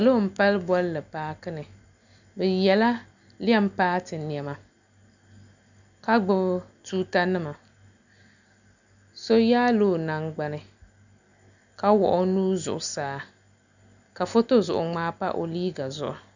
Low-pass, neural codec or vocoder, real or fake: 7.2 kHz; none; real